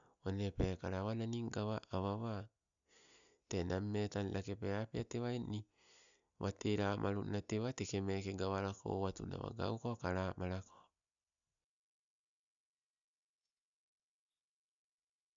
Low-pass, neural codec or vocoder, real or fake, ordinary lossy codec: 7.2 kHz; none; real; none